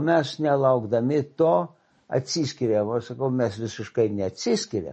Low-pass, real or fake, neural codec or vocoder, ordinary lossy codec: 10.8 kHz; real; none; MP3, 32 kbps